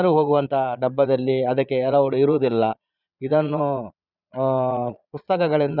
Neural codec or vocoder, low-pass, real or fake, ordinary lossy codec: vocoder, 22.05 kHz, 80 mel bands, Vocos; 5.4 kHz; fake; none